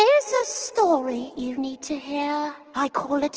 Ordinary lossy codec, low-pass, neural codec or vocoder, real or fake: Opus, 16 kbps; 7.2 kHz; autoencoder, 48 kHz, 128 numbers a frame, DAC-VAE, trained on Japanese speech; fake